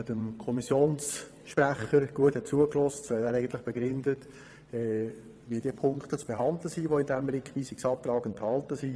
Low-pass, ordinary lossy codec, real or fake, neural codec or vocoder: none; none; fake; vocoder, 22.05 kHz, 80 mel bands, WaveNeXt